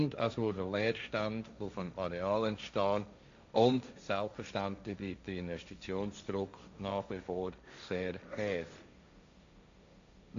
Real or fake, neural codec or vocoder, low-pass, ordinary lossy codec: fake; codec, 16 kHz, 1.1 kbps, Voila-Tokenizer; 7.2 kHz; AAC, 64 kbps